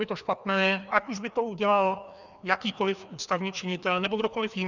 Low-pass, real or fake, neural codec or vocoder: 7.2 kHz; fake; codec, 16 kHz, 2 kbps, FreqCodec, larger model